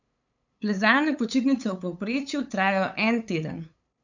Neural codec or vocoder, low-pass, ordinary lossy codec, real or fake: codec, 16 kHz, 8 kbps, FunCodec, trained on LibriTTS, 25 frames a second; 7.2 kHz; none; fake